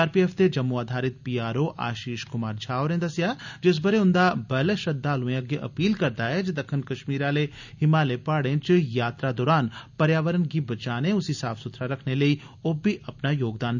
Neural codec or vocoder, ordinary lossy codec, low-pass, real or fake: none; none; 7.2 kHz; real